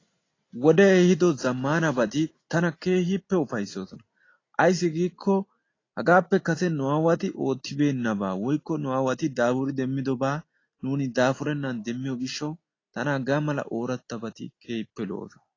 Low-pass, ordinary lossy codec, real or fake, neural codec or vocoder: 7.2 kHz; AAC, 32 kbps; real; none